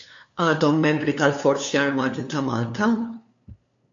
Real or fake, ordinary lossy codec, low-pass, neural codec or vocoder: fake; AAC, 48 kbps; 7.2 kHz; codec, 16 kHz, 2 kbps, FunCodec, trained on LibriTTS, 25 frames a second